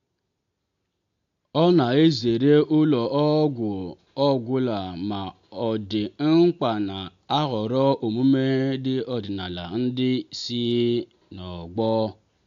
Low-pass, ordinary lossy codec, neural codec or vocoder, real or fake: 7.2 kHz; AAC, 64 kbps; none; real